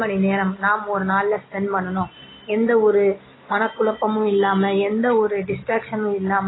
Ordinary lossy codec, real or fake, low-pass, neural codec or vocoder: AAC, 16 kbps; real; 7.2 kHz; none